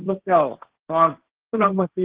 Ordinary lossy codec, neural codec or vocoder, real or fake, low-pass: Opus, 16 kbps; codec, 16 kHz, 1.1 kbps, Voila-Tokenizer; fake; 3.6 kHz